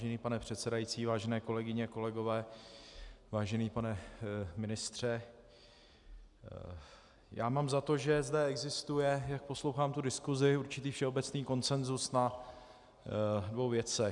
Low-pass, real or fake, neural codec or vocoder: 10.8 kHz; real; none